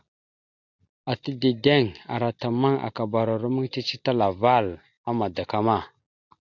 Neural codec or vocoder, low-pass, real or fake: none; 7.2 kHz; real